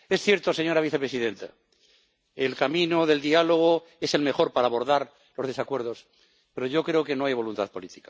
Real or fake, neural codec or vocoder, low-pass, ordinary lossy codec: real; none; none; none